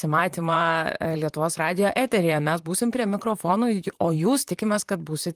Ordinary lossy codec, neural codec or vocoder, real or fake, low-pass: Opus, 32 kbps; vocoder, 44.1 kHz, 128 mel bands, Pupu-Vocoder; fake; 14.4 kHz